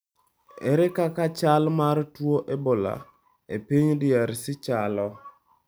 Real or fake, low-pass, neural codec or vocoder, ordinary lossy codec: real; none; none; none